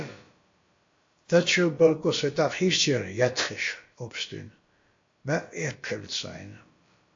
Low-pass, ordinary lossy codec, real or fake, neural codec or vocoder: 7.2 kHz; AAC, 48 kbps; fake; codec, 16 kHz, about 1 kbps, DyCAST, with the encoder's durations